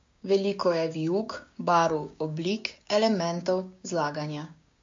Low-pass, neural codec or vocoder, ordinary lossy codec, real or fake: 7.2 kHz; codec, 16 kHz, 6 kbps, DAC; MP3, 48 kbps; fake